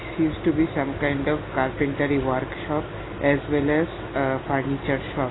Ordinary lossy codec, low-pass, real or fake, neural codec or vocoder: AAC, 16 kbps; 7.2 kHz; real; none